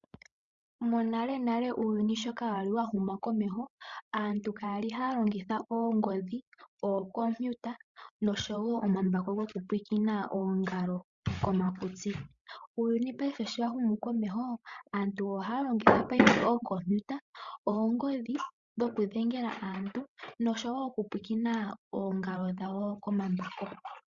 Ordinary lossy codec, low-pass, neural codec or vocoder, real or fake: Opus, 64 kbps; 7.2 kHz; codec, 16 kHz, 16 kbps, FreqCodec, larger model; fake